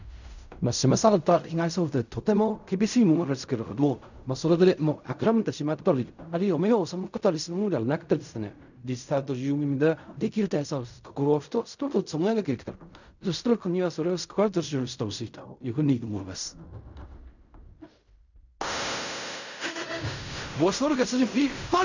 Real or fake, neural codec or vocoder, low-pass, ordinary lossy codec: fake; codec, 16 kHz in and 24 kHz out, 0.4 kbps, LongCat-Audio-Codec, fine tuned four codebook decoder; 7.2 kHz; none